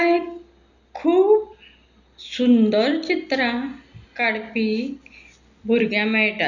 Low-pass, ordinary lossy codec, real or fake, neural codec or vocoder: 7.2 kHz; none; real; none